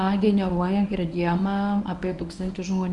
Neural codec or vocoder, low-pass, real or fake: codec, 24 kHz, 0.9 kbps, WavTokenizer, medium speech release version 1; 10.8 kHz; fake